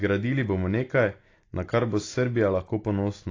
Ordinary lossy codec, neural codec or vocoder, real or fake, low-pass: AAC, 32 kbps; none; real; 7.2 kHz